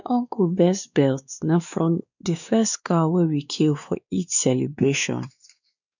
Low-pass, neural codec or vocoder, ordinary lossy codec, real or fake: 7.2 kHz; codec, 16 kHz, 2 kbps, X-Codec, WavLM features, trained on Multilingual LibriSpeech; none; fake